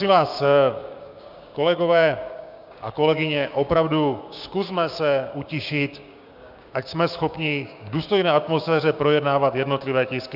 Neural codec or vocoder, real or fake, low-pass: codec, 16 kHz, 6 kbps, DAC; fake; 5.4 kHz